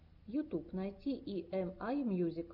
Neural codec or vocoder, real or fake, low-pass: none; real; 5.4 kHz